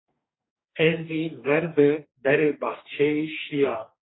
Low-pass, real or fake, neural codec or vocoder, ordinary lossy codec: 7.2 kHz; fake; codec, 44.1 kHz, 2.6 kbps, DAC; AAC, 16 kbps